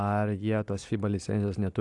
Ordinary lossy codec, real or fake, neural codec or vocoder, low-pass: AAC, 64 kbps; real; none; 10.8 kHz